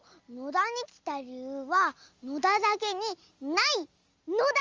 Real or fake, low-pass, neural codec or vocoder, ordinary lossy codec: real; 7.2 kHz; none; Opus, 32 kbps